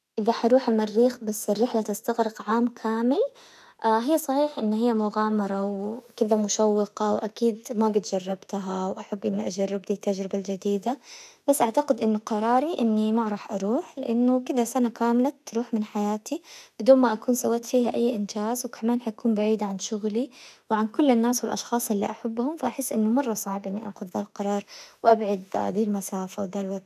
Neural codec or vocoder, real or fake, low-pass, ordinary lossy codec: autoencoder, 48 kHz, 32 numbers a frame, DAC-VAE, trained on Japanese speech; fake; 14.4 kHz; none